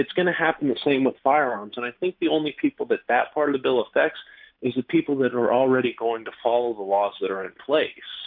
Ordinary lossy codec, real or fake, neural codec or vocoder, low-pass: MP3, 48 kbps; real; none; 5.4 kHz